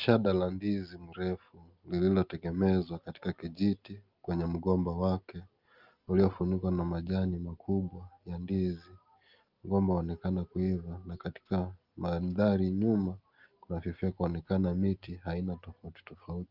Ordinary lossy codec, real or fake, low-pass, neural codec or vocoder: Opus, 24 kbps; real; 5.4 kHz; none